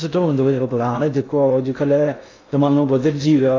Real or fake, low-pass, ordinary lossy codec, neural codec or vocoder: fake; 7.2 kHz; AAC, 32 kbps; codec, 16 kHz in and 24 kHz out, 0.6 kbps, FocalCodec, streaming, 2048 codes